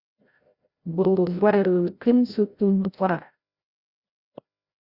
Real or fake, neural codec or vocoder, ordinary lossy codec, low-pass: fake; codec, 16 kHz, 0.5 kbps, FreqCodec, larger model; Opus, 64 kbps; 5.4 kHz